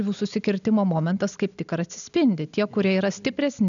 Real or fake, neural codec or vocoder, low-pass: real; none; 7.2 kHz